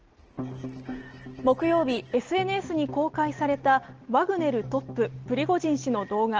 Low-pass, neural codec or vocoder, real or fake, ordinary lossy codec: 7.2 kHz; none; real; Opus, 16 kbps